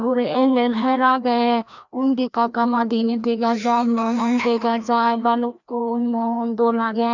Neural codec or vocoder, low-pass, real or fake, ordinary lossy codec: codec, 16 kHz, 1 kbps, FreqCodec, larger model; 7.2 kHz; fake; none